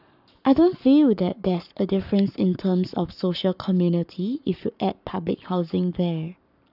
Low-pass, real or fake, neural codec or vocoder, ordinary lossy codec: 5.4 kHz; fake; codec, 44.1 kHz, 7.8 kbps, Pupu-Codec; none